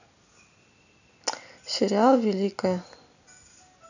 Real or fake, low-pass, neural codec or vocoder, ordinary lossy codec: real; 7.2 kHz; none; none